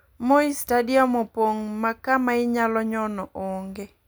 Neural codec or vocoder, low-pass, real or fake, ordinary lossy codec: none; none; real; none